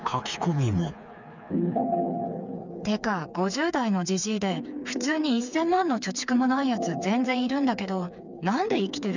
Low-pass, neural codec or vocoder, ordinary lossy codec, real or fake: 7.2 kHz; codec, 16 kHz, 4 kbps, FreqCodec, smaller model; none; fake